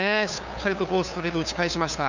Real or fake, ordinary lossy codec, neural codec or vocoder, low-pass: fake; none; codec, 16 kHz, 2 kbps, FunCodec, trained on LibriTTS, 25 frames a second; 7.2 kHz